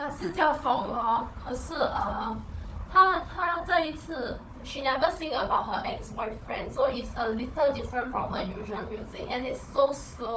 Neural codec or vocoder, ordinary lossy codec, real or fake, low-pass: codec, 16 kHz, 4 kbps, FunCodec, trained on Chinese and English, 50 frames a second; none; fake; none